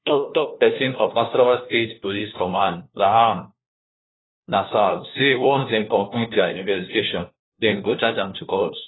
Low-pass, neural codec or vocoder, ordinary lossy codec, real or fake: 7.2 kHz; codec, 16 kHz, 1 kbps, FunCodec, trained on LibriTTS, 50 frames a second; AAC, 16 kbps; fake